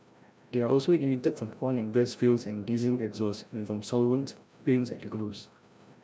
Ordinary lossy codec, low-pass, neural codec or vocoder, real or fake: none; none; codec, 16 kHz, 0.5 kbps, FreqCodec, larger model; fake